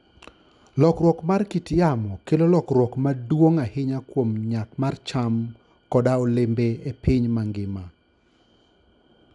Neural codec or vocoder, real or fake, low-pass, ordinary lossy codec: none; real; 10.8 kHz; none